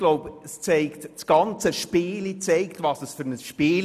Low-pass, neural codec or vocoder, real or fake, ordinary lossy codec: 14.4 kHz; none; real; none